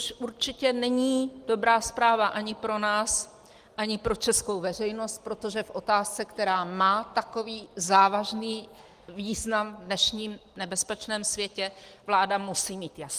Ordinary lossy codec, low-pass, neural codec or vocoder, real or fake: Opus, 24 kbps; 14.4 kHz; none; real